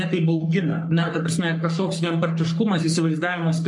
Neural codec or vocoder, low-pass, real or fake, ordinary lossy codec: codec, 44.1 kHz, 3.4 kbps, Pupu-Codec; 10.8 kHz; fake; MP3, 64 kbps